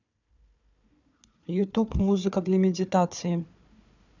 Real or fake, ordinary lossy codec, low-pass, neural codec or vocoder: fake; none; 7.2 kHz; codec, 16 kHz, 4 kbps, FunCodec, trained on Chinese and English, 50 frames a second